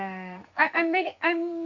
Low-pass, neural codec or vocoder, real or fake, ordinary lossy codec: 7.2 kHz; codec, 44.1 kHz, 2.6 kbps, SNAC; fake; Opus, 64 kbps